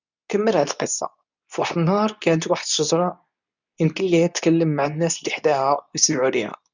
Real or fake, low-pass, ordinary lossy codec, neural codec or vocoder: fake; 7.2 kHz; none; codec, 24 kHz, 0.9 kbps, WavTokenizer, medium speech release version 2